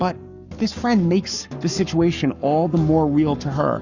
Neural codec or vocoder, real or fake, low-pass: codec, 44.1 kHz, 7.8 kbps, Pupu-Codec; fake; 7.2 kHz